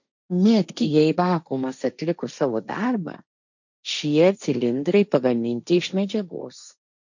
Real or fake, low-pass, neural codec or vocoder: fake; 7.2 kHz; codec, 16 kHz, 1.1 kbps, Voila-Tokenizer